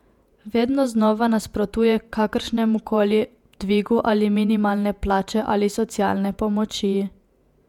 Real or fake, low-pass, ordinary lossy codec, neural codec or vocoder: fake; 19.8 kHz; MP3, 96 kbps; vocoder, 48 kHz, 128 mel bands, Vocos